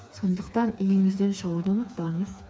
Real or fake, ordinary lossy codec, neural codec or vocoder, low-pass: fake; none; codec, 16 kHz, 4 kbps, FreqCodec, smaller model; none